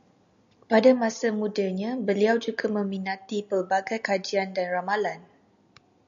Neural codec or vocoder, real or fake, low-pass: none; real; 7.2 kHz